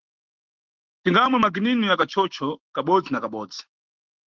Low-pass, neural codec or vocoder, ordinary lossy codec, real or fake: 7.2 kHz; autoencoder, 48 kHz, 128 numbers a frame, DAC-VAE, trained on Japanese speech; Opus, 16 kbps; fake